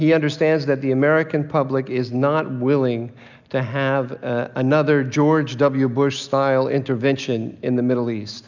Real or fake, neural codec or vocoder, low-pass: real; none; 7.2 kHz